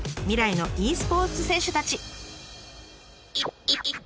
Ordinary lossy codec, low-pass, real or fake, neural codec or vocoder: none; none; real; none